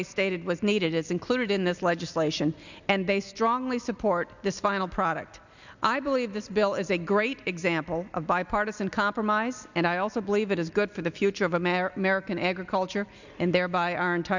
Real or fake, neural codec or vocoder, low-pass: real; none; 7.2 kHz